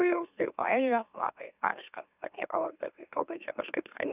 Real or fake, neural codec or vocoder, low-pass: fake; autoencoder, 44.1 kHz, a latent of 192 numbers a frame, MeloTTS; 3.6 kHz